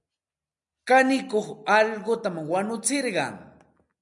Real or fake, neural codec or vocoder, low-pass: real; none; 10.8 kHz